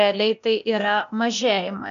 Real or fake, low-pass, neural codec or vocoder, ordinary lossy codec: fake; 7.2 kHz; codec, 16 kHz, 0.8 kbps, ZipCodec; MP3, 96 kbps